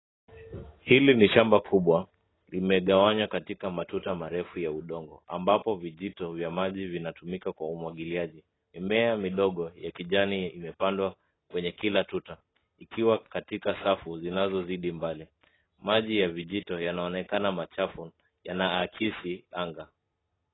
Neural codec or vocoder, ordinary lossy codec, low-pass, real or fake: none; AAC, 16 kbps; 7.2 kHz; real